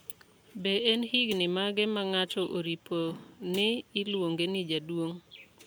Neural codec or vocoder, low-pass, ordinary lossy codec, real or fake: none; none; none; real